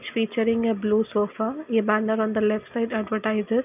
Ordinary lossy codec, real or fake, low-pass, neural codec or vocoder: none; real; 3.6 kHz; none